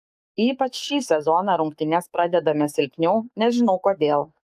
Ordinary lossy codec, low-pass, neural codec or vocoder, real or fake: AAC, 96 kbps; 14.4 kHz; codec, 44.1 kHz, 7.8 kbps, DAC; fake